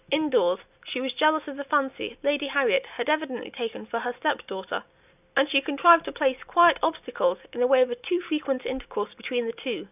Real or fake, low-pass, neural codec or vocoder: real; 3.6 kHz; none